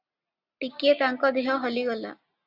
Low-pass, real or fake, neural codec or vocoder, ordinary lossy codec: 5.4 kHz; real; none; Opus, 64 kbps